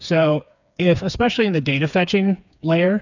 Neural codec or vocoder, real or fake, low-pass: codec, 16 kHz, 4 kbps, FreqCodec, smaller model; fake; 7.2 kHz